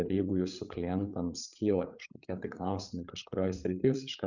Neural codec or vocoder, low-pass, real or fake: codec, 16 kHz, 8 kbps, FreqCodec, larger model; 7.2 kHz; fake